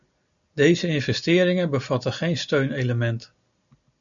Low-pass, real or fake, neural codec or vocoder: 7.2 kHz; real; none